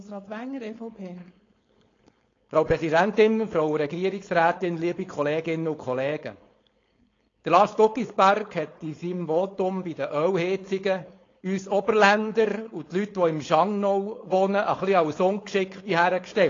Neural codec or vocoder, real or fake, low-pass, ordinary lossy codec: codec, 16 kHz, 4.8 kbps, FACodec; fake; 7.2 kHz; AAC, 32 kbps